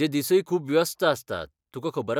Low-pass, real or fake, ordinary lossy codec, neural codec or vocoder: 19.8 kHz; real; none; none